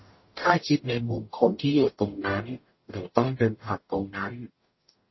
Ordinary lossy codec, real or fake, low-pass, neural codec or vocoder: MP3, 24 kbps; fake; 7.2 kHz; codec, 44.1 kHz, 0.9 kbps, DAC